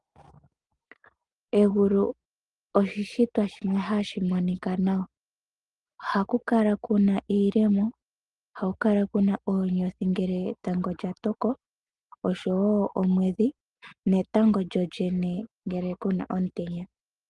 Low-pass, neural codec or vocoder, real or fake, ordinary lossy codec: 10.8 kHz; none; real; Opus, 24 kbps